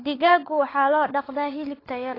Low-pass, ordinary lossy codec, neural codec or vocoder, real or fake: 5.4 kHz; AAC, 24 kbps; codec, 16 kHz, 16 kbps, FunCodec, trained on LibriTTS, 50 frames a second; fake